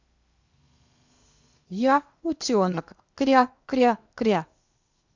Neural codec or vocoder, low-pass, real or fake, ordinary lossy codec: codec, 16 kHz in and 24 kHz out, 0.8 kbps, FocalCodec, streaming, 65536 codes; 7.2 kHz; fake; Opus, 64 kbps